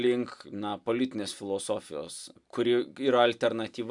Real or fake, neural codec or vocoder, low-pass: real; none; 10.8 kHz